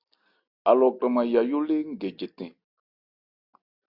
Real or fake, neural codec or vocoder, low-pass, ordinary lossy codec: real; none; 5.4 kHz; Opus, 64 kbps